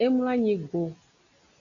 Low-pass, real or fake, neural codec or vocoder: 7.2 kHz; real; none